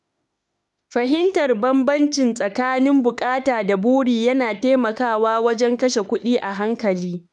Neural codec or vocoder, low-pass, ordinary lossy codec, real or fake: autoencoder, 48 kHz, 32 numbers a frame, DAC-VAE, trained on Japanese speech; 10.8 kHz; none; fake